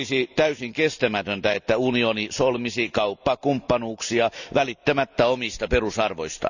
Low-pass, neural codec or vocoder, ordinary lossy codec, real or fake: 7.2 kHz; none; none; real